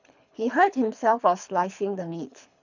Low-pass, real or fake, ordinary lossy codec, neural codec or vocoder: 7.2 kHz; fake; none; codec, 24 kHz, 3 kbps, HILCodec